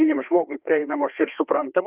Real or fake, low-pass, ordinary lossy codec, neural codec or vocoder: fake; 3.6 kHz; Opus, 32 kbps; codec, 16 kHz, 2 kbps, FreqCodec, larger model